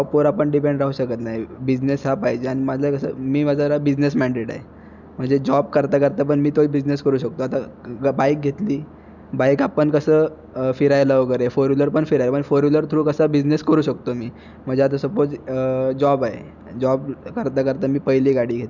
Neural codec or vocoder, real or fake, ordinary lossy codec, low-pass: none; real; none; 7.2 kHz